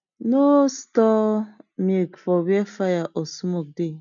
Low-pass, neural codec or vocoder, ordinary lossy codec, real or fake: 7.2 kHz; none; none; real